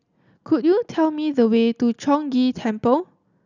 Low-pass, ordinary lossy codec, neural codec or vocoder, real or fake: 7.2 kHz; none; none; real